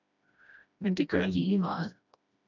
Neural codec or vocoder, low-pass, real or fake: codec, 16 kHz, 1 kbps, FreqCodec, smaller model; 7.2 kHz; fake